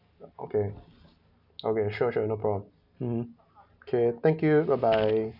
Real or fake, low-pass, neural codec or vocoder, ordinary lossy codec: real; 5.4 kHz; none; none